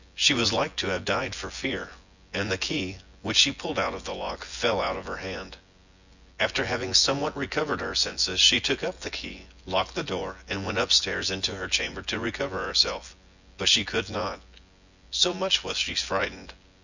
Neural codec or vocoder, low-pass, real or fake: vocoder, 24 kHz, 100 mel bands, Vocos; 7.2 kHz; fake